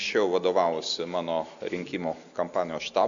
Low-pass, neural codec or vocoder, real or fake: 7.2 kHz; none; real